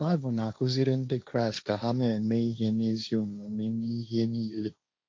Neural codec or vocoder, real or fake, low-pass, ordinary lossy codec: codec, 16 kHz, 1.1 kbps, Voila-Tokenizer; fake; none; none